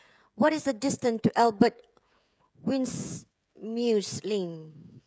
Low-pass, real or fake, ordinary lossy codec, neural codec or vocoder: none; fake; none; codec, 16 kHz, 16 kbps, FreqCodec, smaller model